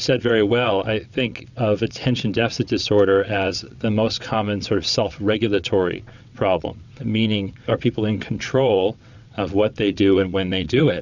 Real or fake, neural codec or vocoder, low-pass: fake; vocoder, 22.05 kHz, 80 mel bands, WaveNeXt; 7.2 kHz